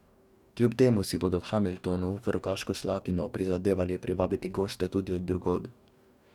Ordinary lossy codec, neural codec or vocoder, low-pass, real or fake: none; codec, 44.1 kHz, 2.6 kbps, DAC; 19.8 kHz; fake